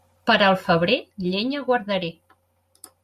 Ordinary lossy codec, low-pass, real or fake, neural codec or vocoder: Opus, 64 kbps; 14.4 kHz; real; none